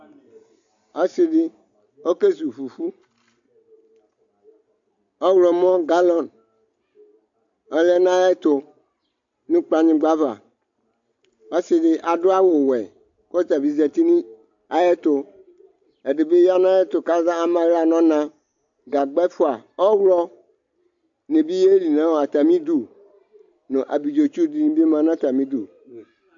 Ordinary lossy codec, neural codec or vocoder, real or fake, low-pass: AAC, 64 kbps; none; real; 7.2 kHz